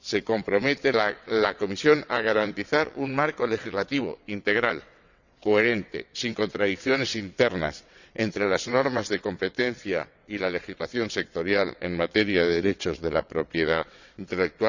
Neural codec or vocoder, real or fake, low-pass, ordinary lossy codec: vocoder, 22.05 kHz, 80 mel bands, WaveNeXt; fake; 7.2 kHz; none